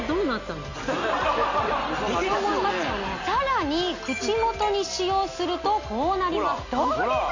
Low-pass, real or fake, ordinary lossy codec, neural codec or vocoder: 7.2 kHz; real; AAC, 48 kbps; none